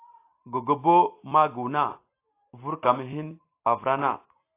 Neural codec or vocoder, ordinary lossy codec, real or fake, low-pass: none; AAC, 24 kbps; real; 3.6 kHz